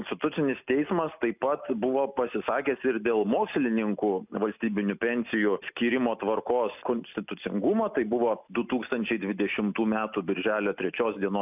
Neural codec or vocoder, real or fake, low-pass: none; real; 3.6 kHz